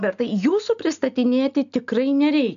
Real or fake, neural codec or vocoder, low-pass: real; none; 7.2 kHz